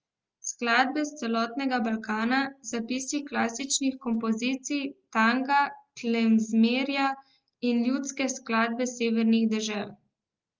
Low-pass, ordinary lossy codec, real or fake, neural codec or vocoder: 7.2 kHz; Opus, 24 kbps; real; none